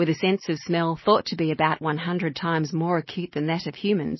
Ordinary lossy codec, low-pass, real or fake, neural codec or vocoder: MP3, 24 kbps; 7.2 kHz; real; none